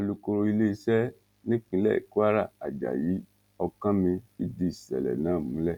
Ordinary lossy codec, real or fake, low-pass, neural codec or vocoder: none; real; 19.8 kHz; none